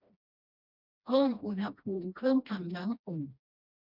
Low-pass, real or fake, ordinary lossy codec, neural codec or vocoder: 5.4 kHz; fake; none; codec, 16 kHz, 1 kbps, FreqCodec, smaller model